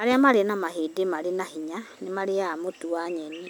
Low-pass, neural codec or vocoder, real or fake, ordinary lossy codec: none; none; real; none